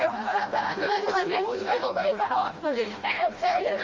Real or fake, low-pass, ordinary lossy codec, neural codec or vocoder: fake; 7.2 kHz; Opus, 32 kbps; codec, 16 kHz, 1 kbps, FreqCodec, smaller model